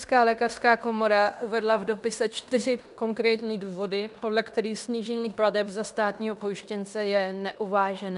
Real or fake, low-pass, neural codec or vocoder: fake; 10.8 kHz; codec, 16 kHz in and 24 kHz out, 0.9 kbps, LongCat-Audio-Codec, fine tuned four codebook decoder